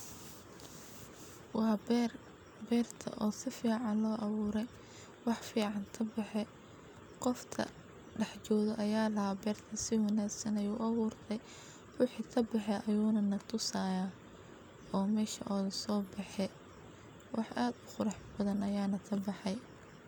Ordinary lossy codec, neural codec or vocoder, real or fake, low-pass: none; none; real; none